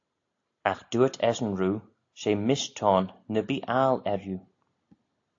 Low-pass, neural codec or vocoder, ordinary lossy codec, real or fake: 7.2 kHz; none; AAC, 48 kbps; real